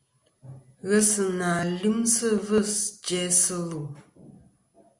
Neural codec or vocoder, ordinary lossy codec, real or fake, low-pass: none; Opus, 64 kbps; real; 10.8 kHz